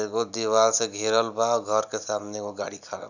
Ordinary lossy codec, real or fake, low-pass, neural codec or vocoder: none; real; 7.2 kHz; none